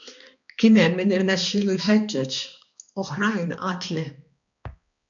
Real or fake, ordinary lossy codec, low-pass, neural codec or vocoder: fake; MP3, 64 kbps; 7.2 kHz; codec, 16 kHz, 2 kbps, X-Codec, HuBERT features, trained on balanced general audio